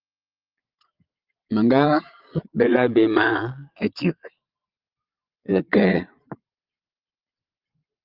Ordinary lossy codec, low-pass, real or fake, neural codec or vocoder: Opus, 32 kbps; 5.4 kHz; fake; vocoder, 22.05 kHz, 80 mel bands, Vocos